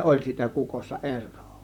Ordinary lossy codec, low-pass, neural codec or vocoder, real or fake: none; 19.8 kHz; vocoder, 44.1 kHz, 128 mel bands, Pupu-Vocoder; fake